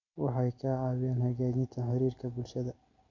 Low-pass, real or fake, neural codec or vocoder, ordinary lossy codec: 7.2 kHz; real; none; none